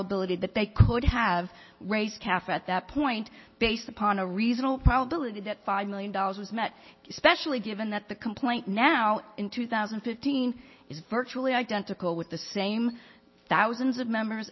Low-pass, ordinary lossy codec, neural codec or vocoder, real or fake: 7.2 kHz; MP3, 24 kbps; none; real